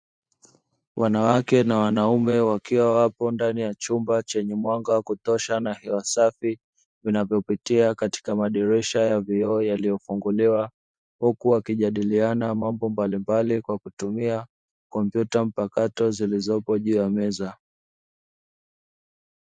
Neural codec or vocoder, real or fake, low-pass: vocoder, 24 kHz, 100 mel bands, Vocos; fake; 9.9 kHz